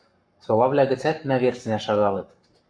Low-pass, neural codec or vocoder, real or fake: 9.9 kHz; codec, 44.1 kHz, 7.8 kbps, Pupu-Codec; fake